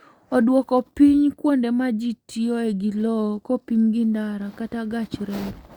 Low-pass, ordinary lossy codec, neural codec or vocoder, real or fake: 19.8 kHz; Opus, 64 kbps; none; real